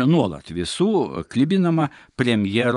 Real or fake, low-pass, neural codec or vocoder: fake; 10.8 kHz; vocoder, 24 kHz, 100 mel bands, Vocos